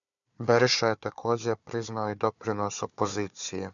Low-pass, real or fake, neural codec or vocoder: 7.2 kHz; fake; codec, 16 kHz, 4 kbps, FunCodec, trained on Chinese and English, 50 frames a second